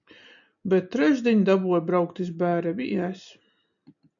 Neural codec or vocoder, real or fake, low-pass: none; real; 7.2 kHz